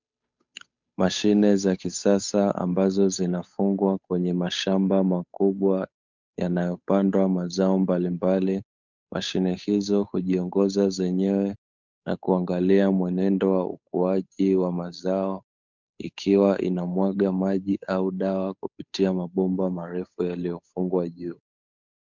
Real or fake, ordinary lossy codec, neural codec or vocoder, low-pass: fake; MP3, 64 kbps; codec, 16 kHz, 8 kbps, FunCodec, trained on Chinese and English, 25 frames a second; 7.2 kHz